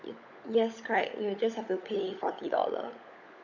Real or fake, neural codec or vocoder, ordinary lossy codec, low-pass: fake; codec, 16 kHz, 16 kbps, FunCodec, trained on LibriTTS, 50 frames a second; none; 7.2 kHz